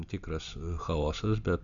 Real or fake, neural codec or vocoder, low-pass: real; none; 7.2 kHz